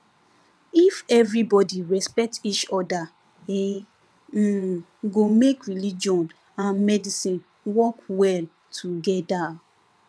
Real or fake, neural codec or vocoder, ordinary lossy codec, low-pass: fake; vocoder, 22.05 kHz, 80 mel bands, WaveNeXt; none; none